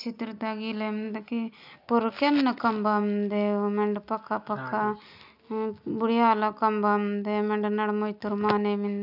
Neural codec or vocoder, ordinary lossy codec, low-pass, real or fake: none; none; 5.4 kHz; real